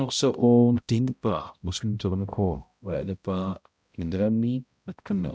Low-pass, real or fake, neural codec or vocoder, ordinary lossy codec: none; fake; codec, 16 kHz, 0.5 kbps, X-Codec, HuBERT features, trained on balanced general audio; none